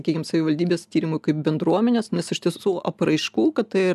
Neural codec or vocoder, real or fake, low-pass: none; real; 14.4 kHz